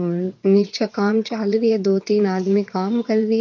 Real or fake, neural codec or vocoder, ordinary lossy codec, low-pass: fake; vocoder, 22.05 kHz, 80 mel bands, WaveNeXt; MP3, 48 kbps; 7.2 kHz